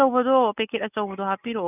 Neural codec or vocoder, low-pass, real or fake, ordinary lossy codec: none; 3.6 kHz; real; none